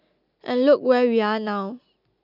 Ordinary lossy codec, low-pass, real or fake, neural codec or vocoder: none; 5.4 kHz; real; none